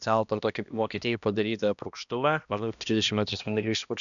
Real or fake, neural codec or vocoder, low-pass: fake; codec, 16 kHz, 1 kbps, X-Codec, HuBERT features, trained on balanced general audio; 7.2 kHz